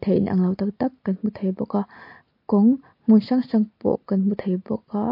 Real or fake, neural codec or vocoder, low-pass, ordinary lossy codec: real; none; 5.4 kHz; MP3, 32 kbps